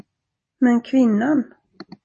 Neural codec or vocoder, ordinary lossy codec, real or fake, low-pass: none; MP3, 32 kbps; real; 10.8 kHz